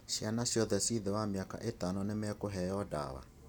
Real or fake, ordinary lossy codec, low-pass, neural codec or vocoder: real; none; none; none